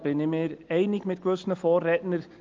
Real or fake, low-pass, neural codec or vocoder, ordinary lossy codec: real; 7.2 kHz; none; Opus, 24 kbps